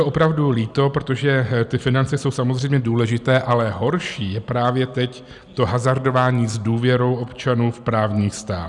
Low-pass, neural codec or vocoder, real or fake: 10.8 kHz; none; real